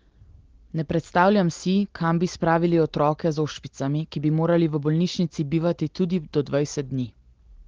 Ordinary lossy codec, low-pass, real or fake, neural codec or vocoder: Opus, 16 kbps; 7.2 kHz; real; none